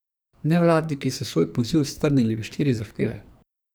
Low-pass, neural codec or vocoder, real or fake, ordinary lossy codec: none; codec, 44.1 kHz, 2.6 kbps, SNAC; fake; none